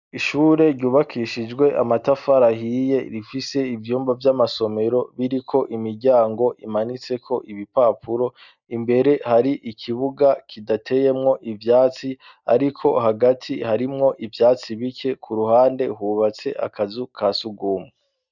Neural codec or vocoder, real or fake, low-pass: none; real; 7.2 kHz